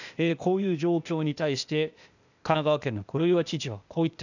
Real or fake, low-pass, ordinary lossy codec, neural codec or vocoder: fake; 7.2 kHz; none; codec, 16 kHz, 0.8 kbps, ZipCodec